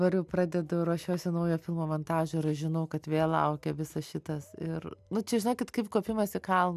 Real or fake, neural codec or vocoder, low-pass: real; none; 14.4 kHz